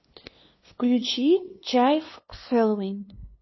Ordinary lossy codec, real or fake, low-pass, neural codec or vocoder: MP3, 24 kbps; fake; 7.2 kHz; codec, 16 kHz, 2 kbps, FunCodec, trained on LibriTTS, 25 frames a second